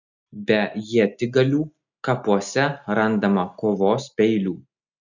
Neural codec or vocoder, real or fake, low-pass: none; real; 7.2 kHz